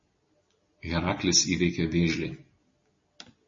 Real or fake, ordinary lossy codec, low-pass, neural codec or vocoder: real; MP3, 32 kbps; 7.2 kHz; none